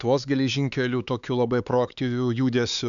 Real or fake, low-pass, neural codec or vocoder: fake; 7.2 kHz; codec, 16 kHz, 4 kbps, X-Codec, HuBERT features, trained on LibriSpeech